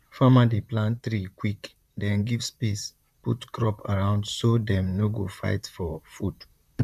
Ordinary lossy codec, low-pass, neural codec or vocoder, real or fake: none; 14.4 kHz; vocoder, 44.1 kHz, 128 mel bands, Pupu-Vocoder; fake